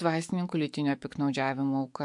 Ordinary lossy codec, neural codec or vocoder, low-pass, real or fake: MP3, 64 kbps; autoencoder, 48 kHz, 128 numbers a frame, DAC-VAE, trained on Japanese speech; 10.8 kHz; fake